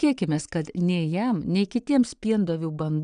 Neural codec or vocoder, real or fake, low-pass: vocoder, 22.05 kHz, 80 mel bands, Vocos; fake; 9.9 kHz